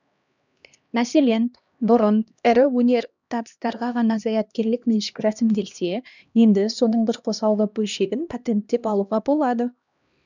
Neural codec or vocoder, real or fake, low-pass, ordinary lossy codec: codec, 16 kHz, 1 kbps, X-Codec, HuBERT features, trained on LibriSpeech; fake; 7.2 kHz; none